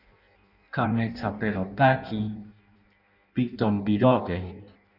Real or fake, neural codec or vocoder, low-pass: fake; codec, 16 kHz in and 24 kHz out, 0.6 kbps, FireRedTTS-2 codec; 5.4 kHz